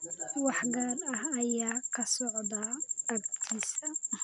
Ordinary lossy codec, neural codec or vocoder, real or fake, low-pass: MP3, 96 kbps; none; real; 10.8 kHz